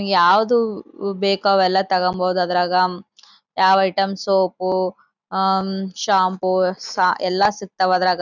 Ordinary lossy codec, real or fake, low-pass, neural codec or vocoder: none; real; 7.2 kHz; none